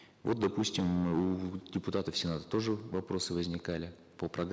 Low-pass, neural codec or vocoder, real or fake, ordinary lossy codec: none; none; real; none